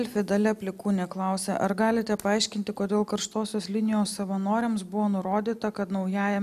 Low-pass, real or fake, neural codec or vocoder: 14.4 kHz; real; none